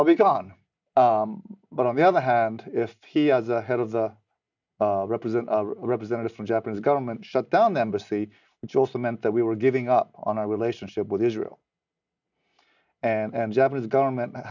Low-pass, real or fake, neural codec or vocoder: 7.2 kHz; real; none